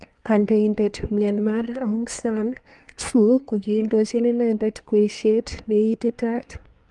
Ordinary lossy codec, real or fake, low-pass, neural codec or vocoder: Opus, 32 kbps; fake; 10.8 kHz; codec, 24 kHz, 1 kbps, SNAC